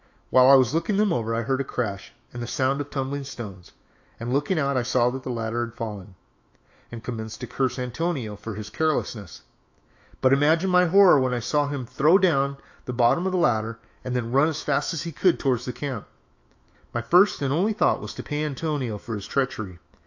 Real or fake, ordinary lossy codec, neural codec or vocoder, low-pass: fake; AAC, 48 kbps; autoencoder, 48 kHz, 128 numbers a frame, DAC-VAE, trained on Japanese speech; 7.2 kHz